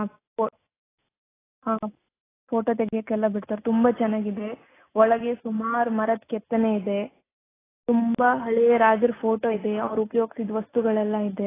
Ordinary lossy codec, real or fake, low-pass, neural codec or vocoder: AAC, 16 kbps; real; 3.6 kHz; none